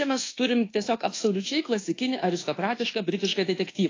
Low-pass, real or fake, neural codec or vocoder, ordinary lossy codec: 7.2 kHz; fake; codec, 24 kHz, 1.2 kbps, DualCodec; AAC, 32 kbps